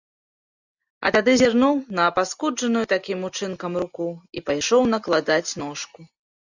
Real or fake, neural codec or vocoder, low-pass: real; none; 7.2 kHz